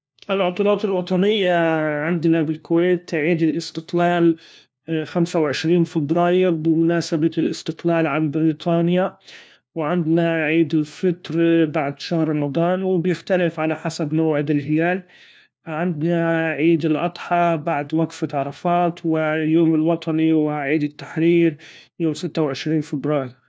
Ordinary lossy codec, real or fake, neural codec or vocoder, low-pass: none; fake; codec, 16 kHz, 1 kbps, FunCodec, trained on LibriTTS, 50 frames a second; none